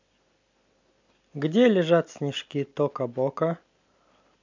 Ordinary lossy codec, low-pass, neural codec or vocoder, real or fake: MP3, 64 kbps; 7.2 kHz; none; real